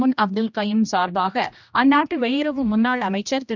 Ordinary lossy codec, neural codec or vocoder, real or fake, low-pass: none; codec, 16 kHz, 1 kbps, X-Codec, HuBERT features, trained on general audio; fake; 7.2 kHz